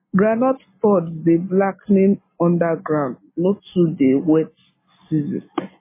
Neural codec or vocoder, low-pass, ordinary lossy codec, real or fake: none; 3.6 kHz; MP3, 16 kbps; real